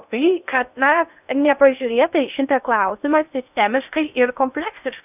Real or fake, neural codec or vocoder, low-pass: fake; codec, 16 kHz in and 24 kHz out, 0.6 kbps, FocalCodec, streaming, 2048 codes; 3.6 kHz